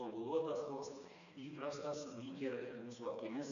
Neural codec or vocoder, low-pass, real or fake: codec, 16 kHz, 2 kbps, FreqCodec, smaller model; 7.2 kHz; fake